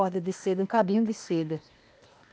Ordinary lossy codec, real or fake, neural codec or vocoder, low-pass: none; fake; codec, 16 kHz, 0.8 kbps, ZipCodec; none